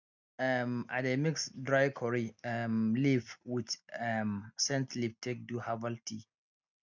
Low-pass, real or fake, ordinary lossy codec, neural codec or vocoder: 7.2 kHz; real; none; none